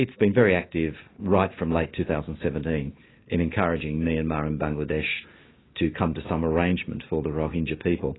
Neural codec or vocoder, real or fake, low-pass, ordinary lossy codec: none; real; 7.2 kHz; AAC, 16 kbps